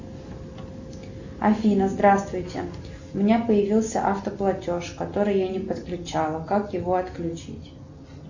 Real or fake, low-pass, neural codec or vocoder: real; 7.2 kHz; none